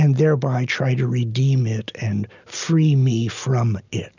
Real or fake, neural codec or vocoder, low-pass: real; none; 7.2 kHz